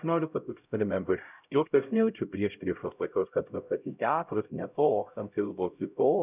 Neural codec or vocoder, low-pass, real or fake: codec, 16 kHz, 0.5 kbps, X-Codec, HuBERT features, trained on LibriSpeech; 3.6 kHz; fake